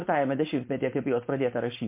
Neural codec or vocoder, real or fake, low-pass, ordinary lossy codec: codec, 16 kHz, 4.8 kbps, FACodec; fake; 3.6 kHz; MP3, 24 kbps